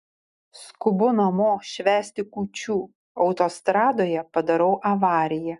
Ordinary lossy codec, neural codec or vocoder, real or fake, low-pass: MP3, 96 kbps; none; real; 10.8 kHz